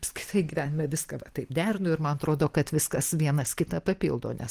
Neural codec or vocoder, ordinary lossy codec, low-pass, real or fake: none; Opus, 24 kbps; 14.4 kHz; real